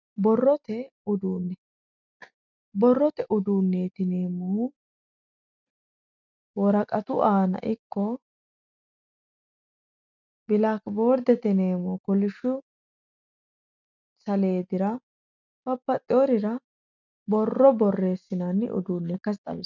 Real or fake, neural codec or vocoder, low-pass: real; none; 7.2 kHz